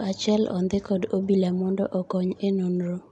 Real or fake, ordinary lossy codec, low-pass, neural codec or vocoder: real; MP3, 64 kbps; 10.8 kHz; none